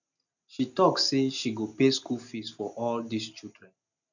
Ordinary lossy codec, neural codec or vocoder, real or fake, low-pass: none; none; real; 7.2 kHz